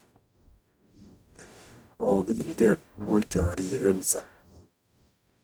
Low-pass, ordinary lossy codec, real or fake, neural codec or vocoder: none; none; fake; codec, 44.1 kHz, 0.9 kbps, DAC